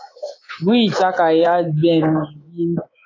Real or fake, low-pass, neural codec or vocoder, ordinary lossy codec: fake; 7.2 kHz; codec, 24 kHz, 3.1 kbps, DualCodec; AAC, 32 kbps